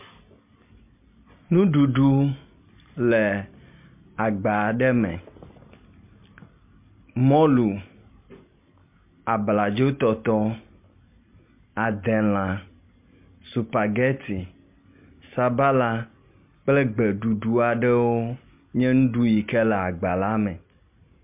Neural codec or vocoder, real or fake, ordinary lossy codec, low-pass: none; real; MP3, 32 kbps; 3.6 kHz